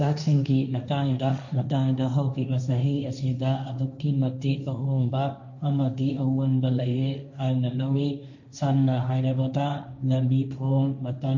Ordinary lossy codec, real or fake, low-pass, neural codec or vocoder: none; fake; 7.2 kHz; codec, 16 kHz, 1.1 kbps, Voila-Tokenizer